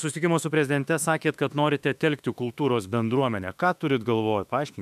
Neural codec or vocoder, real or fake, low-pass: autoencoder, 48 kHz, 32 numbers a frame, DAC-VAE, trained on Japanese speech; fake; 14.4 kHz